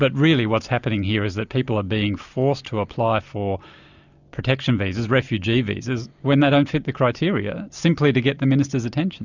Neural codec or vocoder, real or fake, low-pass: none; real; 7.2 kHz